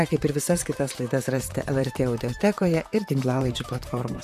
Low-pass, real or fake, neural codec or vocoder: 14.4 kHz; fake; vocoder, 44.1 kHz, 128 mel bands, Pupu-Vocoder